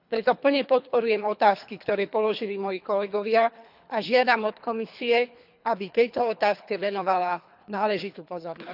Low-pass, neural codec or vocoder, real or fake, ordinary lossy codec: 5.4 kHz; codec, 24 kHz, 3 kbps, HILCodec; fake; none